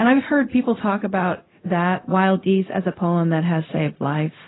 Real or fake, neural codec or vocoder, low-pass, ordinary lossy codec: fake; codec, 16 kHz, 0.4 kbps, LongCat-Audio-Codec; 7.2 kHz; AAC, 16 kbps